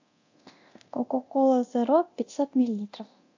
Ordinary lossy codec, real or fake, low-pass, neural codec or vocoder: AAC, 48 kbps; fake; 7.2 kHz; codec, 24 kHz, 0.9 kbps, DualCodec